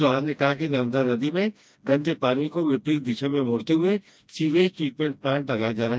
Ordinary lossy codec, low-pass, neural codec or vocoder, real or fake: none; none; codec, 16 kHz, 1 kbps, FreqCodec, smaller model; fake